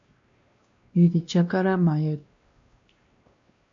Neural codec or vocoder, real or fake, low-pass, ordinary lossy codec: codec, 16 kHz, 1 kbps, X-Codec, WavLM features, trained on Multilingual LibriSpeech; fake; 7.2 kHz; MP3, 48 kbps